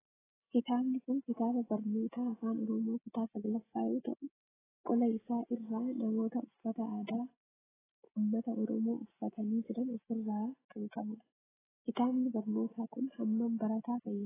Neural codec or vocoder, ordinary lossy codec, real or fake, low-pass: none; AAC, 16 kbps; real; 3.6 kHz